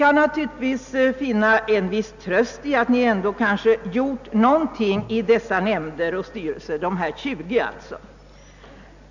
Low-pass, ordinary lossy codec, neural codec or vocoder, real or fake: 7.2 kHz; none; none; real